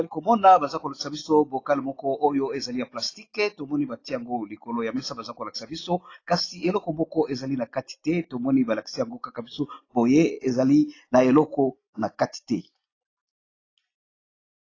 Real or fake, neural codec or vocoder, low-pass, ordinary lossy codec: real; none; 7.2 kHz; AAC, 32 kbps